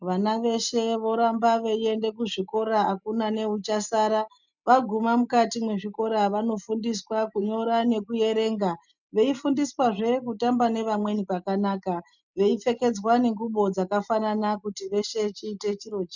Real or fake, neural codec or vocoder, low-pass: real; none; 7.2 kHz